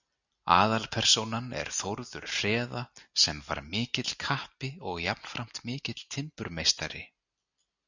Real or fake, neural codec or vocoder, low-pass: real; none; 7.2 kHz